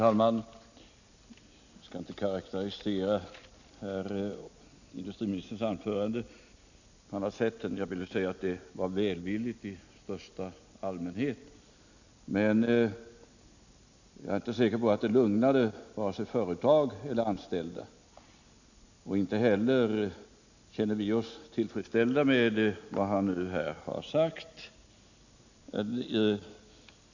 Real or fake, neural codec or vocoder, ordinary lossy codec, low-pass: real; none; none; 7.2 kHz